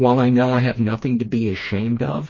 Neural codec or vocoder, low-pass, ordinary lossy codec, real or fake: codec, 16 kHz, 2 kbps, FreqCodec, smaller model; 7.2 kHz; MP3, 32 kbps; fake